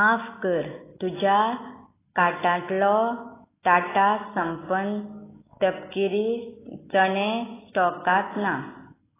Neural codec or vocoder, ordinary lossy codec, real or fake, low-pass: none; AAC, 16 kbps; real; 3.6 kHz